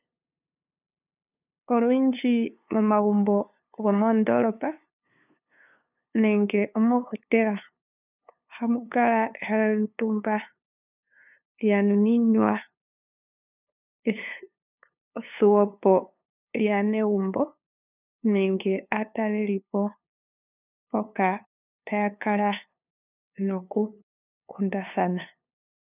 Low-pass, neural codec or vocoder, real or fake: 3.6 kHz; codec, 16 kHz, 2 kbps, FunCodec, trained on LibriTTS, 25 frames a second; fake